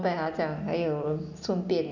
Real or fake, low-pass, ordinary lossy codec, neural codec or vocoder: real; 7.2 kHz; none; none